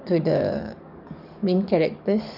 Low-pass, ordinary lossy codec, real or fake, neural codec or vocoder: 5.4 kHz; none; fake; codec, 44.1 kHz, 7.8 kbps, DAC